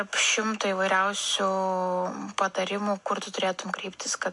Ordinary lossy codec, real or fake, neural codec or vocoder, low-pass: MP3, 64 kbps; real; none; 10.8 kHz